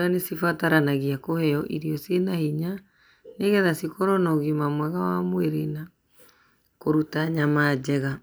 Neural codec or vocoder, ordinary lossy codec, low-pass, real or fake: none; none; none; real